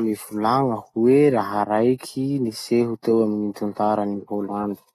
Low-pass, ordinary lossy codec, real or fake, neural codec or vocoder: 19.8 kHz; AAC, 32 kbps; real; none